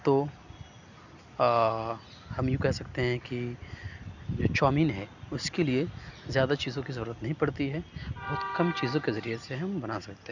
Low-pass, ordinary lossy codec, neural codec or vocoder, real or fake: 7.2 kHz; none; none; real